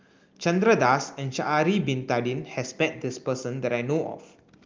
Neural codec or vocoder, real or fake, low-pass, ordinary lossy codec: none; real; 7.2 kHz; Opus, 32 kbps